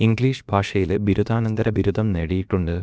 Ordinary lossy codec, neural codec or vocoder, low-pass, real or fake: none; codec, 16 kHz, about 1 kbps, DyCAST, with the encoder's durations; none; fake